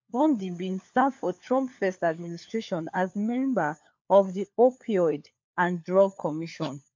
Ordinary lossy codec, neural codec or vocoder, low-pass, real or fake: MP3, 48 kbps; codec, 16 kHz, 4 kbps, FunCodec, trained on LibriTTS, 50 frames a second; 7.2 kHz; fake